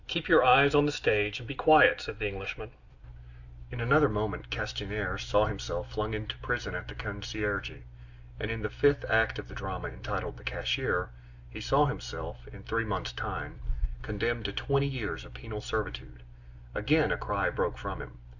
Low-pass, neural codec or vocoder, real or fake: 7.2 kHz; none; real